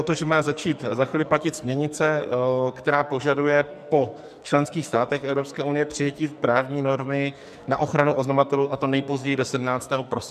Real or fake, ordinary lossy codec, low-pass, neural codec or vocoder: fake; MP3, 96 kbps; 14.4 kHz; codec, 44.1 kHz, 2.6 kbps, SNAC